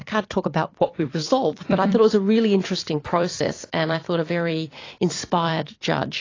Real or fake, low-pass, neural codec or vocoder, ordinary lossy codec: fake; 7.2 kHz; vocoder, 44.1 kHz, 128 mel bands every 256 samples, BigVGAN v2; AAC, 32 kbps